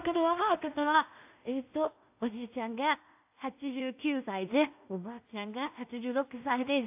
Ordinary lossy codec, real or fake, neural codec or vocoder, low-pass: AAC, 32 kbps; fake; codec, 16 kHz in and 24 kHz out, 0.4 kbps, LongCat-Audio-Codec, two codebook decoder; 3.6 kHz